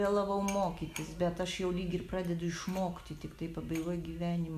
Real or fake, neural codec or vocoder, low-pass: real; none; 14.4 kHz